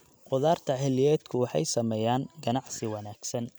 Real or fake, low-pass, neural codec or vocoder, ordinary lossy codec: real; none; none; none